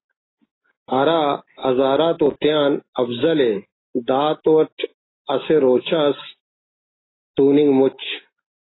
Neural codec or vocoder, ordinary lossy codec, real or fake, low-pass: none; AAC, 16 kbps; real; 7.2 kHz